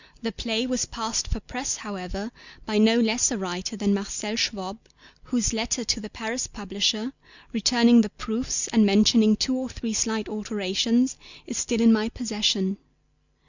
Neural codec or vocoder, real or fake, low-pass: none; real; 7.2 kHz